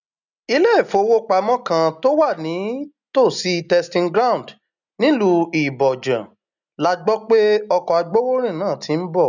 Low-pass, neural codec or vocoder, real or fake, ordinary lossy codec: 7.2 kHz; none; real; none